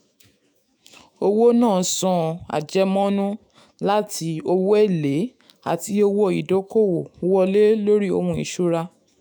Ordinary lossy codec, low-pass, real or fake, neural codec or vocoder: none; none; fake; autoencoder, 48 kHz, 128 numbers a frame, DAC-VAE, trained on Japanese speech